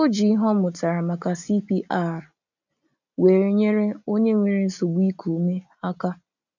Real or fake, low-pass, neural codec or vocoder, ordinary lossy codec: real; 7.2 kHz; none; none